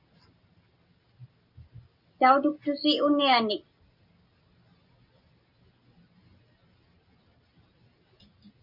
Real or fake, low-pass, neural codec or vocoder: real; 5.4 kHz; none